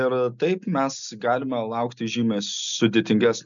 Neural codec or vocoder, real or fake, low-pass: none; real; 7.2 kHz